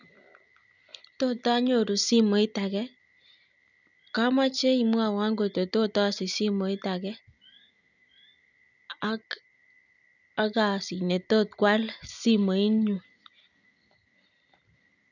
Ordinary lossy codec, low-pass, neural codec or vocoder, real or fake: none; 7.2 kHz; none; real